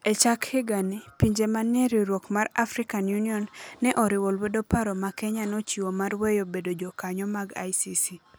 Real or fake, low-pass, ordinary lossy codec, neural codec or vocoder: real; none; none; none